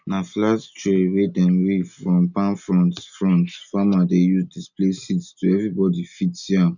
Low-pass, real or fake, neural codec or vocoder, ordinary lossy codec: 7.2 kHz; real; none; none